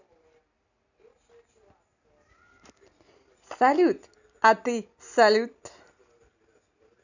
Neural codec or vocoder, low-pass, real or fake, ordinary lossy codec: none; 7.2 kHz; real; none